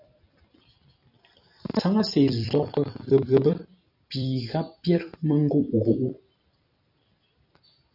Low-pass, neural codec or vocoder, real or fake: 5.4 kHz; vocoder, 44.1 kHz, 128 mel bands every 256 samples, BigVGAN v2; fake